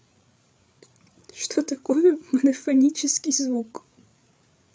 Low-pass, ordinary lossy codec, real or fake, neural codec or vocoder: none; none; fake; codec, 16 kHz, 8 kbps, FreqCodec, larger model